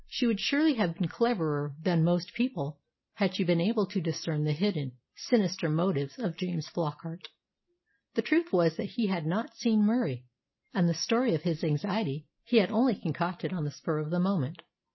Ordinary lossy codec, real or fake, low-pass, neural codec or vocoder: MP3, 24 kbps; real; 7.2 kHz; none